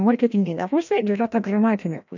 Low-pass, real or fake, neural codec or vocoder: 7.2 kHz; fake; codec, 16 kHz, 1 kbps, FreqCodec, larger model